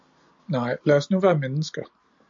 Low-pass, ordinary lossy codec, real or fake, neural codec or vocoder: 7.2 kHz; MP3, 48 kbps; real; none